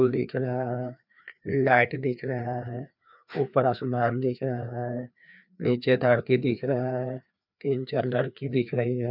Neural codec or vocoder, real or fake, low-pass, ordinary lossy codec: codec, 16 kHz, 2 kbps, FreqCodec, larger model; fake; 5.4 kHz; none